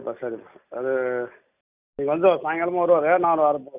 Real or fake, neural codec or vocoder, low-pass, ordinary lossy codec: real; none; 3.6 kHz; none